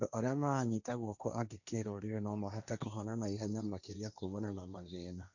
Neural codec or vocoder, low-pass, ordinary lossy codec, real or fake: codec, 16 kHz, 1.1 kbps, Voila-Tokenizer; 7.2 kHz; none; fake